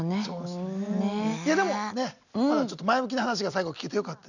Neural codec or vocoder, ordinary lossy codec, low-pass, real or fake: none; none; 7.2 kHz; real